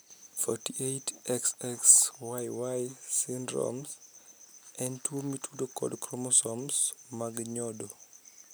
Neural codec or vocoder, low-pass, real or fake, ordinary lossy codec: none; none; real; none